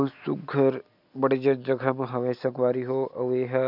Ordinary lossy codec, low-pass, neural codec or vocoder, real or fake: none; 5.4 kHz; none; real